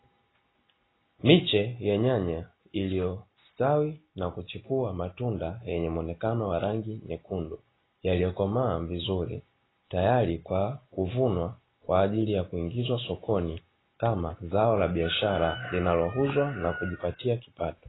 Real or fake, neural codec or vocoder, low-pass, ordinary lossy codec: real; none; 7.2 kHz; AAC, 16 kbps